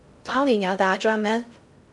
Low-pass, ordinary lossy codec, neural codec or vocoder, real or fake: 10.8 kHz; MP3, 96 kbps; codec, 16 kHz in and 24 kHz out, 0.6 kbps, FocalCodec, streaming, 4096 codes; fake